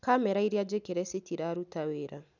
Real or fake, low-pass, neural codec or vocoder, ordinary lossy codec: real; 7.2 kHz; none; none